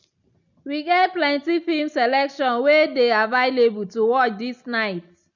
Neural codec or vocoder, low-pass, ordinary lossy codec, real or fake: none; 7.2 kHz; none; real